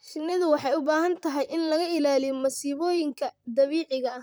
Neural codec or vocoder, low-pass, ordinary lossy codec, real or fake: vocoder, 44.1 kHz, 128 mel bands, Pupu-Vocoder; none; none; fake